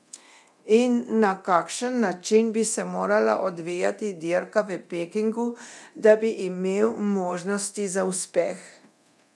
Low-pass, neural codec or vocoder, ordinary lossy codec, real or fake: none; codec, 24 kHz, 0.9 kbps, DualCodec; none; fake